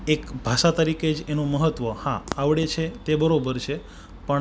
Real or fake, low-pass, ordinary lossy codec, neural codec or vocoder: real; none; none; none